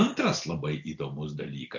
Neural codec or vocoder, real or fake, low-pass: none; real; 7.2 kHz